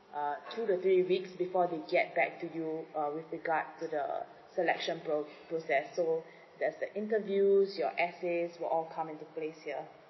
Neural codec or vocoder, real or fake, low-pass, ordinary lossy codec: none; real; 7.2 kHz; MP3, 24 kbps